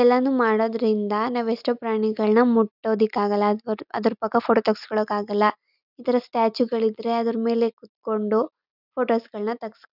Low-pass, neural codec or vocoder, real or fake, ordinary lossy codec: 5.4 kHz; none; real; none